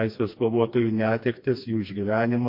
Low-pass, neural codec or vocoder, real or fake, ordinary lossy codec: 5.4 kHz; codec, 16 kHz, 2 kbps, FreqCodec, smaller model; fake; MP3, 32 kbps